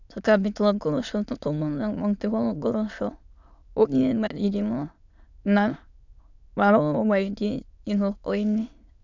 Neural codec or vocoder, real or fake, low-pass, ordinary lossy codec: autoencoder, 22.05 kHz, a latent of 192 numbers a frame, VITS, trained on many speakers; fake; 7.2 kHz; none